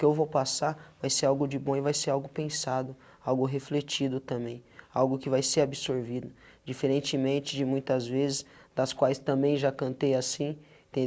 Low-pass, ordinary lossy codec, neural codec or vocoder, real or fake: none; none; none; real